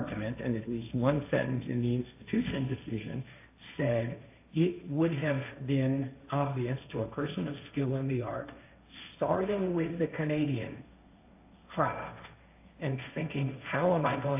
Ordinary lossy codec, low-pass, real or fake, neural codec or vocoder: AAC, 32 kbps; 3.6 kHz; fake; codec, 16 kHz, 1.1 kbps, Voila-Tokenizer